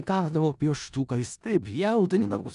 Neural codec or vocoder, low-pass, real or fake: codec, 16 kHz in and 24 kHz out, 0.4 kbps, LongCat-Audio-Codec, four codebook decoder; 10.8 kHz; fake